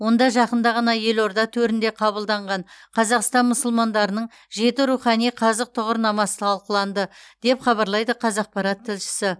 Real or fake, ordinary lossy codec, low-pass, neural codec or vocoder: real; none; none; none